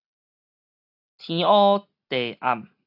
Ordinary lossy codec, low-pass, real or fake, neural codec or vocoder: AAC, 48 kbps; 5.4 kHz; real; none